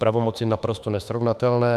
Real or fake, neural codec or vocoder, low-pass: fake; autoencoder, 48 kHz, 32 numbers a frame, DAC-VAE, trained on Japanese speech; 14.4 kHz